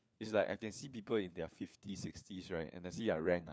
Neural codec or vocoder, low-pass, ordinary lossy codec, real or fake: codec, 16 kHz, 4 kbps, FunCodec, trained on LibriTTS, 50 frames a second; none; none; fake